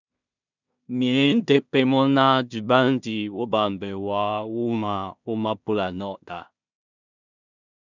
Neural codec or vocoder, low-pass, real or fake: codec, 16 kHz in and 24 kHz out, 0.4 kbps, LongCat-Audio-Codec, two codebook decoder; 7.2 kHz; fake